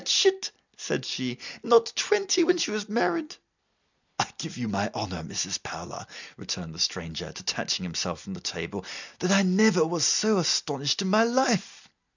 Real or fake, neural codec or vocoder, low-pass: real; none; 7.2 kHz